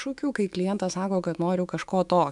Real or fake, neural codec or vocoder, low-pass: fake; autoencoder, 48 kHz, 128 numbers a frame, DAC-VAE, trained on Japanese speech; 10.8 kHz